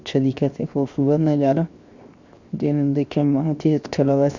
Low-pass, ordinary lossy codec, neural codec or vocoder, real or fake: 7.2 kHz; Opus, 64 kbps; codec, 16 kHz, 0.7 kbps, FocalCodec; fake